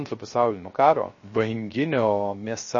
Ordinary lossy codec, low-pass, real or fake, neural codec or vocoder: MP3, 32 kbps; 7.2 kHz; fake; codec, 16 kHz, 0.3 kbps, FocalCodec